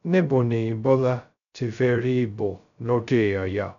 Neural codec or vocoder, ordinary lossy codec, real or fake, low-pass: codec, 16 kHz, 0.2 kbps, FocalCodec; none; fake; 7.2 kHz